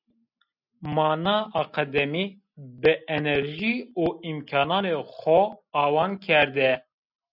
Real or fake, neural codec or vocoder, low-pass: real; none; 5.4 kHz